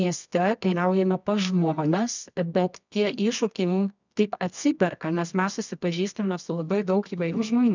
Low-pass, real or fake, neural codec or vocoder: 7.2 kHz; fake; codec, 24 kHz, 0.9 kbps, WavTokenizer, medium music audio release